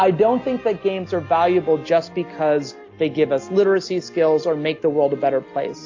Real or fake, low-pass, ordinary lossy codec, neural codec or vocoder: real; 7.2 kHz; AAC, 48 kbps; none